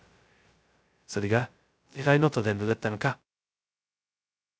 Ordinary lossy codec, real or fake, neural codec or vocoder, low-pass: none; fake; codec, 16 kHz, 0.2 kbps, FocalCodec; none